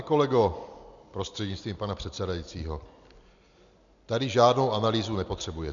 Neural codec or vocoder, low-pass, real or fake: none; 7.2 kHz; real